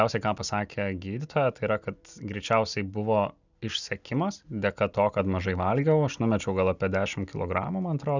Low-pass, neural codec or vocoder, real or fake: 7.2 kHz; none; real